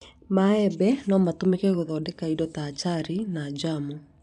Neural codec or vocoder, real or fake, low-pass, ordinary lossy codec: vocoder, 44.1 kHz, 128 mel bands every 512 samples, BigVGAN v2; fake; 10.8 kHz; none